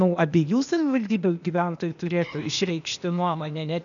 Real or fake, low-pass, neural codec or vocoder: fake; 7.2 kHz; codec, 16 kHz, 0.8 kbps, ZipCodec